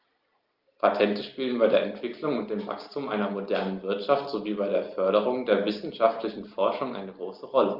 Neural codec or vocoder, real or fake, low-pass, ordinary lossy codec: none; real; 5.4 kHz; Opus, 24 kbps